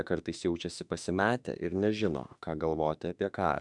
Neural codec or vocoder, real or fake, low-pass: autoencoder, 48 kHz, 32 numbers a frame, DAC-VAE, trained on Japanese speech; fake; 10.8 kHz